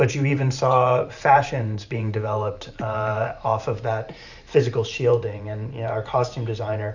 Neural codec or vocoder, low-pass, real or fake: vocoder, 44.1 kHz, 128 mel bands every 512 samples, BigVGAN v2; 7.2 kHz; fake